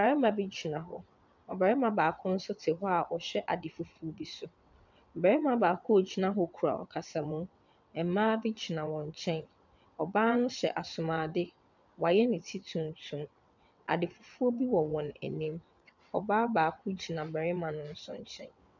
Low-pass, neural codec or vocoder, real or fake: 7.2 kHz; vocoder, 22.05 kHz, 80 mel bands, WaveNeXt; fake